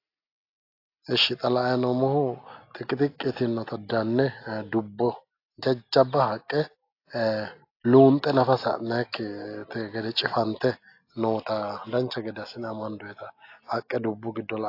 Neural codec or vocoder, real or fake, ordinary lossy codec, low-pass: none; real; AAC, 32 kbps; 5.4 kHz